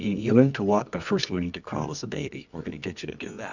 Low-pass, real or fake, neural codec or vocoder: 7.2 kHz; fake; codec, 24 kHz, 0.9 kbps, WavTokenizer, medium music audio release